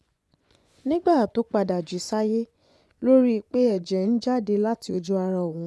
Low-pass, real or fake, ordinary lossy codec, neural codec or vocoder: none; fake; none; vocoder, 24 kHz, 100 mel bands, Vocos